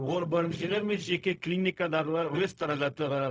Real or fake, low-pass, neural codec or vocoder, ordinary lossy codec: fake; none; codec, 16 kHz, 0.4 kbps, LongCat-Audio-Codec; none